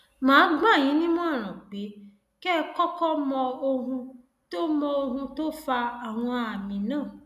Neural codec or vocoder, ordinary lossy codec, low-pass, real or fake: none; none; 14.4 kHz; real